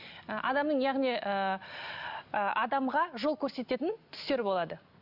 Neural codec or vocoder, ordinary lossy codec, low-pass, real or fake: none; Opus, 64 kbps; 5.4 kHz; real